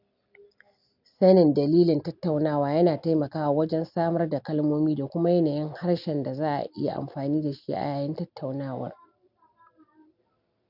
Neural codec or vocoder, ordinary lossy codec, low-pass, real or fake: none; none; 5.4 kHz; real